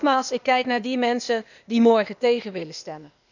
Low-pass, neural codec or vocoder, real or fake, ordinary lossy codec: 7.2 kHz; codec, 16 kHz, 0.8 kbps, ZipCodec; fake; none